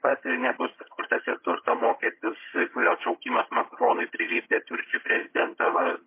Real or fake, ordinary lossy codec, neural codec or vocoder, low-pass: fake; MP3, 24 kbps; vocoder, 22.05 kHz, 80 mel bands, HiFi-GAN; 3.6 kHz